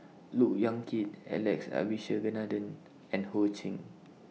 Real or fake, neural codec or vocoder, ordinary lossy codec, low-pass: real; none; none; none